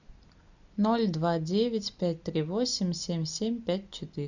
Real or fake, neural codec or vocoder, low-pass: real; none; 7.2 kHz